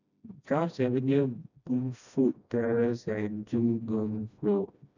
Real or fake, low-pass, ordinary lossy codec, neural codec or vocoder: fake; 7.2 kHz; none; codec, 16 kHz, 1 kbps, FreqCodec, smaller model